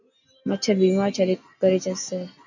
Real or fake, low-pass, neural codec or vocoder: real; 7.2 kHz; none